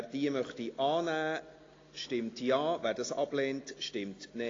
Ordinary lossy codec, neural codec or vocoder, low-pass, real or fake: AAC, 32 kbps; none; 7.2 kHz; real